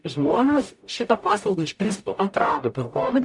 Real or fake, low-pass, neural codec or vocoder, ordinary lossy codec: fake; 10.8 kHz; codec, 44.1 kHz, 0.9 kbps, DAC; MP3, 96 kbps